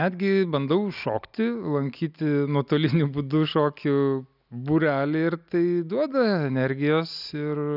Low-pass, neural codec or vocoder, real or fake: 5.4 kHz; none; real